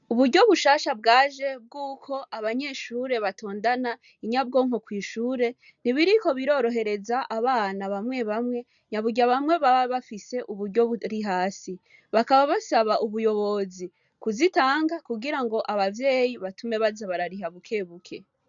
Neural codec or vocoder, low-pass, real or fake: none; 7.2 kHz; real